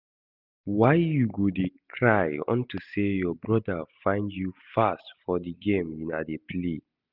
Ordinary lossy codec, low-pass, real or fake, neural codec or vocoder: none; 5.4 kHz; real; none